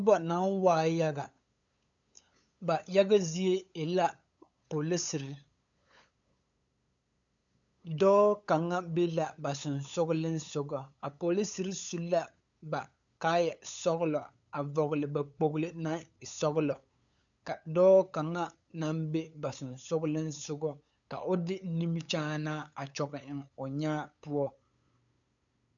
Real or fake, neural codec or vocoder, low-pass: fake; codec, 16 kHz, 8 kbps, FunCodec, trained on LibriTTS, 25 frames a second; 7.2 kHz